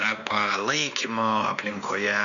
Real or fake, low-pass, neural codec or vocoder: fake; 7.2 kHz; codec, 16 kHz, 2 kbps, X-Codec, WavLM features, trained on Multilingual LibriSpeech